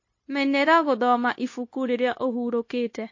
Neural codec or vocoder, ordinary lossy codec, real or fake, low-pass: codec, 16 kHz, 0.9 kbps, LongCat-Audio-Codec; MP3, 32 kbps; fake; 7.2 kHz